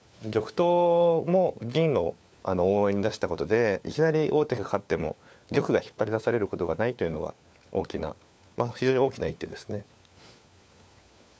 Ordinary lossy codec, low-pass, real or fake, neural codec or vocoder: none; none; fake; codec, 16 kHz, 4 kbps, FunCodec, trained on LibriTTS, 50 frames a second